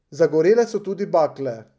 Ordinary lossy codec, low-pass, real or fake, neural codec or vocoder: none; none; real; none